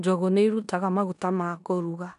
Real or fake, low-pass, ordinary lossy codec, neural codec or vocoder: fake; 10.8 kHz; none; codec, 16 kHz in and 24 kHz out, 0.9 kbps, LongCat-Audio-Codec, four codebook decoder